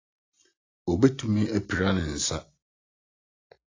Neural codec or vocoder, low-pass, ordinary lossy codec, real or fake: none; 7.2 kHz; AAC, 32 kbps; real